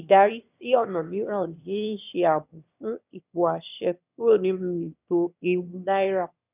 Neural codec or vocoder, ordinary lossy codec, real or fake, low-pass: autoencoder, 22.05 kHz, a latent of 192 numbers a frame, VITS, trained on one speaker; none; fake; 3.6 kHz